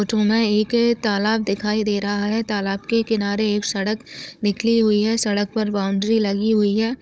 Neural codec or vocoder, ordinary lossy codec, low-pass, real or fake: codec, 16 kHz, 16 kbps, FunCodec, trained on Chinese and English, 50 frames a second; none; none; fake